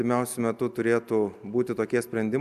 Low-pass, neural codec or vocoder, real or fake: 14.4 kHz; none; real